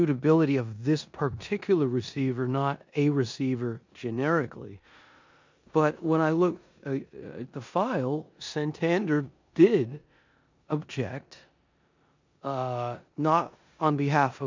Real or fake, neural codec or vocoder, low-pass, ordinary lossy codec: fake; codec, 16 kHz in and 24 kHz out, 0.9 kbps, LongCat-Audio-Codec, four codebook decoder; 7.2 kHz; MP3, 64 kbps